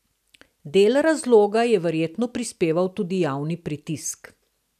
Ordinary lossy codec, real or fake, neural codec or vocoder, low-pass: none; real; none; 14.4 kHz